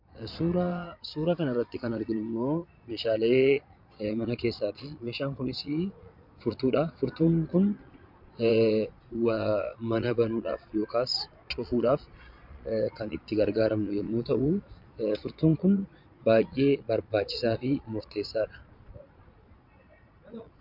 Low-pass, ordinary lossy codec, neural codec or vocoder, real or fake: 5.4 kHz; MP3, 48 kbps; vocoder, 22.05 kHz, 80 mel bands, Vocos; fake